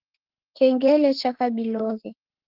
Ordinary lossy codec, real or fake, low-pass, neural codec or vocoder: Opus, 16 kbps; fake; 5.4 kHz; autoencoder, 48 kHz, 32 numbers a frame, DAC-VAE, trained on Japanese speech